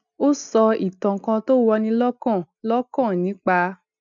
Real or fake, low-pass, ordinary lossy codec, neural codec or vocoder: real; 7.2 kHz; none; none